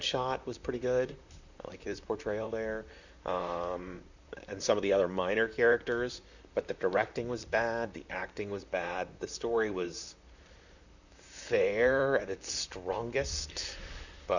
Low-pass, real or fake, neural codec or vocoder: 7.2 kHz; fake; vocoder, 44.1 kHz, 128 mel bands, Pupu-Vocoder